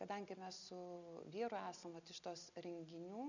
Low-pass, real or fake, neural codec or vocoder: 7.2 kHz; real; none